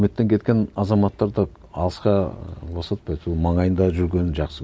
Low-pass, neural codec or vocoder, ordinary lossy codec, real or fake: none; none; none; real